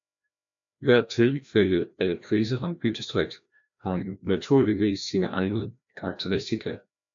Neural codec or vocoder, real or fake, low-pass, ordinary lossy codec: codec, 16 kHz, 1 kbps, FreqCodec, larger model; fake; 7.2 kHz; AAC, 64 kbps